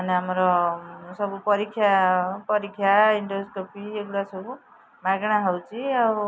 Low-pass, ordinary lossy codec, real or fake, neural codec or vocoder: none; none; real; none